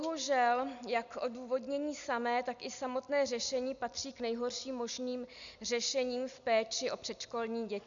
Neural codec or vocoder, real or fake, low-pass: none; real; 7.2 kHz